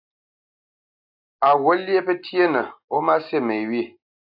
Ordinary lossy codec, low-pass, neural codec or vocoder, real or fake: AAC, 48 kbps; 5.4 kHz; none; real